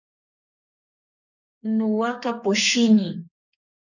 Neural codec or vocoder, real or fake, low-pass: codec, 32 kHz, 1.9 kbps, SNAC; fake; 7.2 kHz